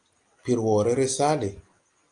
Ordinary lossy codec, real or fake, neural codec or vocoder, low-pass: Opus, 32 kbps; real; none; 9.9 kHz